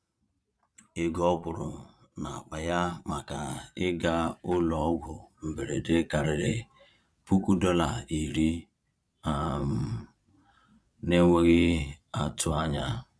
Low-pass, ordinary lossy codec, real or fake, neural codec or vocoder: none; none; fake; vocoder, 22.05 kHz, 80 mel bands, Vocos